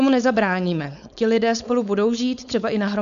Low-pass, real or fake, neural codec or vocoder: 7.2 kHz; fake; codec, 16 kHz, 4.8 kbps, FACodec